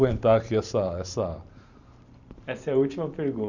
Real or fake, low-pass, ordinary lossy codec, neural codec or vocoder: fake; 7.2 kHz; none; vocoder, 44.1 kHz, 128 mel bands every 512 samples, BigVGAN v2